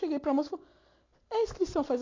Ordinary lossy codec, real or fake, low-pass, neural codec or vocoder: AAC, 32 kbps; real; 7.2 kHz; none